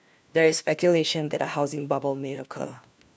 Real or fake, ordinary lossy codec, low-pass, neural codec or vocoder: fake; none; none; codec, 16 kHz, 1 kbps, FunCodec, trained on LibriTTS, 50 frames a second